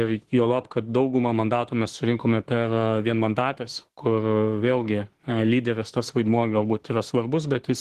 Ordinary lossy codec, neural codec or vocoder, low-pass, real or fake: Opus, 16 kbps; autoencoder, 48 kHz, 32 numbers a frame, DAC-VAE, trained on Japanese speech; 14.4 kHz; fake